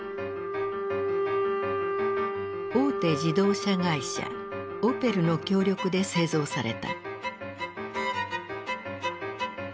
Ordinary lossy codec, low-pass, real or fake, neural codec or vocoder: none; none; real; none